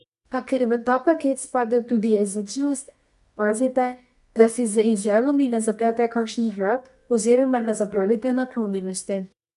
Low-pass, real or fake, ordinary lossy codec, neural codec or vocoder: 10.8 kHz; fake; none; codec, 24 kHz, 0.9 kbps, WavTokenizer, medium music audio release